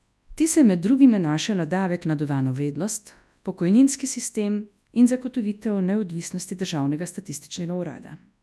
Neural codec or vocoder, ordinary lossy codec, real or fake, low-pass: codec, 24 kHz, 0.9 kbps, WavTokenizer, large speech release; none; fake; none